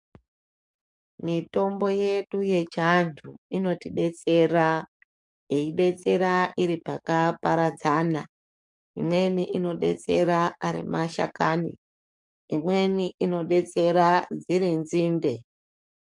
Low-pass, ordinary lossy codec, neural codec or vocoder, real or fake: 10.8 kHz; MP3, 64 kbps; codec, 44.1 kHz, 7.8 kbps, DAC; fake